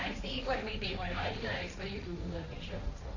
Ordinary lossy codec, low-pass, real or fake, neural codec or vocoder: none; 7.2 kHz; fake; codec, 16 kHz, 1.1 kbps, Voila-Tokenizer